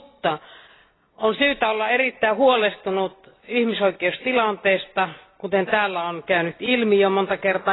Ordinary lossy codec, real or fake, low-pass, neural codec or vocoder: AAC, 16 kbps; real; 7.2 kHz; none